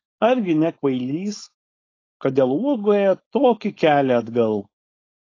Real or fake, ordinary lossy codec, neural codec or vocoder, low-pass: fake; AAC, 32 kbps; codec, 16 kHz, 4.8 kbps, FACodec; 7.2 kHz